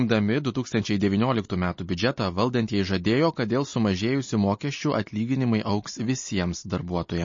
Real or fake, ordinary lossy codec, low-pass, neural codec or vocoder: real; MP3, 32 kbps; 7.2 kHz; none